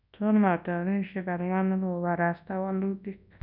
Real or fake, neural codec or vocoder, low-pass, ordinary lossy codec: fake; codec, 24 kHz, 0.9 kbps, WavTokenizer, large speech release; 5.4 kHz; none